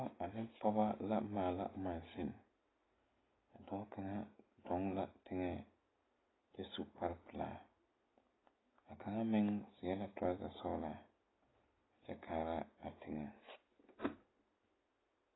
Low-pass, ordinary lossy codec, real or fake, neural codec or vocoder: 7.2 kHz; AAC, 16 kbps; real; none